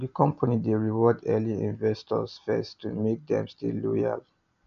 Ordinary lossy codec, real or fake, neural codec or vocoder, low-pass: none; real; none; 7.2 kHz